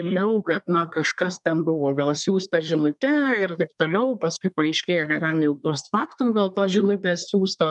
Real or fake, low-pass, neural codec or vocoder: fake; 10.8 kHz; codec, 24 kHz, 1 kbps, SNAC